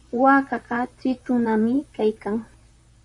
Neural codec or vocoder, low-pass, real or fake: vocoder, 44.1 kHz, 128 mel bands, Pupu-Vocoder; 10.8 kHz; fake